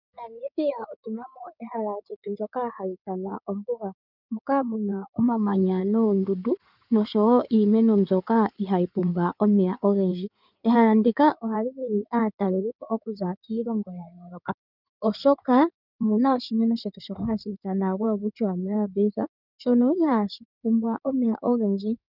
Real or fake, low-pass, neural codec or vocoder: fake; 5.4 kHz; codec, 16 kHz in and 24 kHz out, 2.2 kbps, FireRedTTS-2 codec